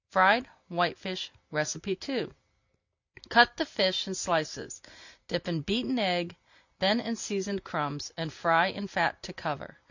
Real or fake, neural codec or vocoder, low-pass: real; none; 7.2 kHz